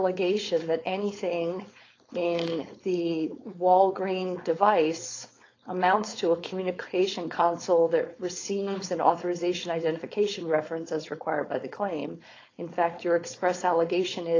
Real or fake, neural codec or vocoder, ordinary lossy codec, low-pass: fake; codec, 16 kHz, 4.8 kbps, FACodec; AAC, 32 kbps; 7.2 kHz